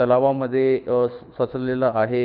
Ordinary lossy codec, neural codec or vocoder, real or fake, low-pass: none; codec, 24 kHz, 6 kbps, HILCodec; fake; 5.4 kHz